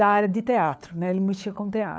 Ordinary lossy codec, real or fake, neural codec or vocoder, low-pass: none; fake; codec, 16 kHz, 4 kbps, FunCodec, trained on LibriTTS, 50 frames a second; none